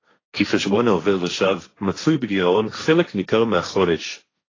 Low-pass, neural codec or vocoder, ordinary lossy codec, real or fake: 7.2 kHz; codec, 16 kHz, 1.1 kbps, Voila-Tokenizer; AAC, 32 kbps; fake